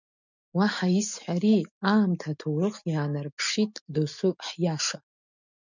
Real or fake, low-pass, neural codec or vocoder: real; 7.2 kHz; none